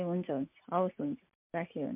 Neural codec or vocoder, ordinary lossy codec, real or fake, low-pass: none; MP3, 32 kbps; real; 3.6 kHz